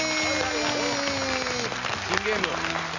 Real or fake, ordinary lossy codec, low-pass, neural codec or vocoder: real; none; 7.2 kHz; none